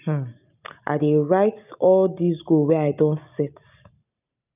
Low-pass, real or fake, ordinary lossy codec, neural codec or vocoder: 3.6 kHz; real; none; none